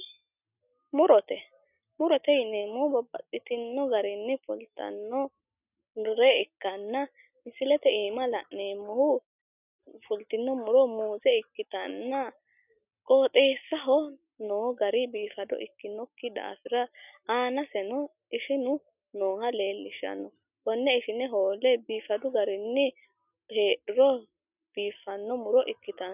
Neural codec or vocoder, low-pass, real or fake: none; 3.6 kHz; real